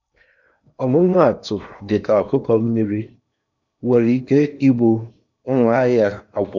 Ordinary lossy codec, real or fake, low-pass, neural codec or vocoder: none; fake; 7.2 kHz; codec, 16 kHz in and 24 kHz out, 0.8 kbps, FocalCodec, streaming, 65536 codes